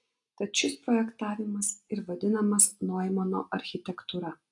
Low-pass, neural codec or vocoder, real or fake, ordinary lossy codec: 10.8 kHz; none; real; AAC, 48 kbps